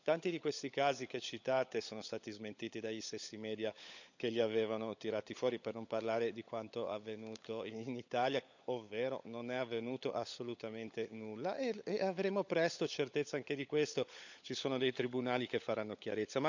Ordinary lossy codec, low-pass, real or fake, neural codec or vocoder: none; 7.2 kHz; fake; codec, 16 kHz, 16 kbps, FunCodec, trained on LibriTTS, 50 frames a second